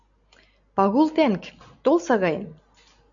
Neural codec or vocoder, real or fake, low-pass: none; real; 7.2 kHz